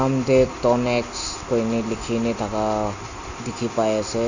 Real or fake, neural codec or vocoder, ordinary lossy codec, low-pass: real; none; none; 7.2 kHz